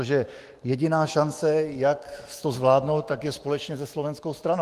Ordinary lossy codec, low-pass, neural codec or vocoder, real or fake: Opus, 24 kbps; 14.4 kHz; autoencoder, 48 kHz, 128 numbers a frame, DAC-VAE, trained on Japanese speech; fake